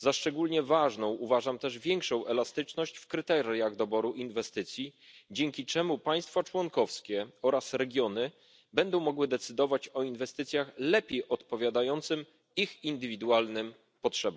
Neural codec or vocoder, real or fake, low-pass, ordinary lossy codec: none; real; none; none